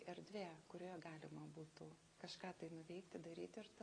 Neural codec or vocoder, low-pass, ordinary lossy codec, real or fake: none; 9.9 kHz; AAC, 32 kbps; real